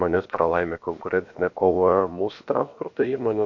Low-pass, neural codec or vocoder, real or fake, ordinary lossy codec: 7.2 kHz; codec, 16 kHz, about 1 kbps, DyCAST, with the encoder's durations; fake; MP3, 48 kbps